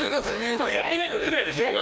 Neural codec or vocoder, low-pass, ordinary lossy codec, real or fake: codec, 16 kHz, 1 kbps, FreqCodec, larger model; none; none; fake